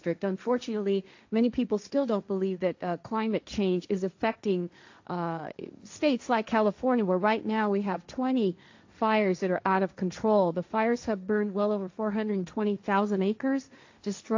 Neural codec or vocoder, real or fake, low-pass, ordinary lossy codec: codec, 16 kHz, 1.1 kbps, Voila-Tokenizer; fake; 7.2 kHz; AAC, 48 kbps